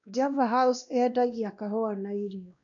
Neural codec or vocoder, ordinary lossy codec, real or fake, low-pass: codec, 16 kHz, 1 kbps, X-Codec, WavLM features, trained on Multilingual LibriSpeech; none; fake; 7.2 kHz